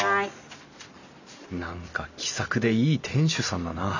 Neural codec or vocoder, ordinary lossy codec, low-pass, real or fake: none; none; 7.2 kHz; real